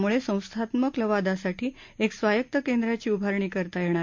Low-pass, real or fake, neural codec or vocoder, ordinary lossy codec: 7.2 kHz; real; none; MP3, 48 kbps